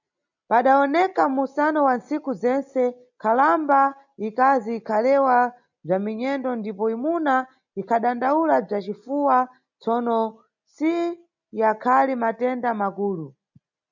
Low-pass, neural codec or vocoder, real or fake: 7.2 kHz; none; real